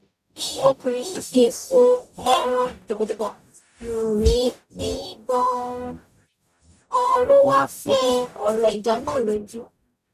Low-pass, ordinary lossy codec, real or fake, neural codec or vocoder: 14.4 kHz; none; fake; codec, 44.1 kHz, 0.9 kbps, DAC